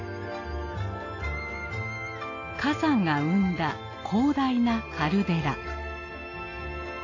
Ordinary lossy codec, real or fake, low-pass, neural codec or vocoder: AAC, 32 kbps; real; 7.2 kHz; none